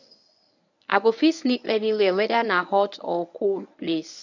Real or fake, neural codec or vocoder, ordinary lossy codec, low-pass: fake; codec, 24 kHz, 0.9 kbps, WavTokenizer, medium speech release version 1; none; 7.2 kHz